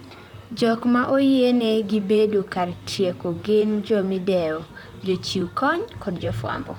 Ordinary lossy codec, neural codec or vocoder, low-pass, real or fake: none; vocoder, 44.1 kHz, 128 mel bands, Pupu-Vocoder; 19.8 kHz; fake